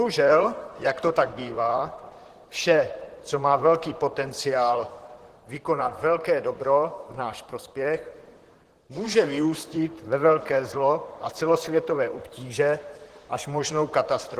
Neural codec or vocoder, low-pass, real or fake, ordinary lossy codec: vocoder, 44.1 kHz, 128 mel bands, Pupu-Vocoder; 14.4 kHz; fake; Opus, 16 kbps